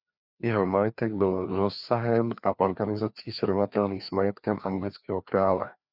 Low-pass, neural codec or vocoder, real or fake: 5.4 kHz; codec, 16 kHz, 2 kbps, FreqCodec, larger model; fake